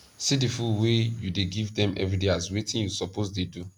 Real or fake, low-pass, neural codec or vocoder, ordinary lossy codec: real; 19.8 kHz; none; none